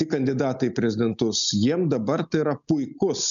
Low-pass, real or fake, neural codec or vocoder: 7.2 kHz; real; none